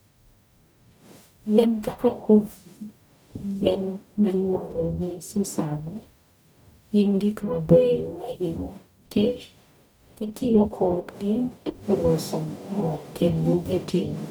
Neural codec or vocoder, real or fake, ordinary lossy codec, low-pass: codec, 44.1 kHz, 0.9 kbps, DAC; fake; none; none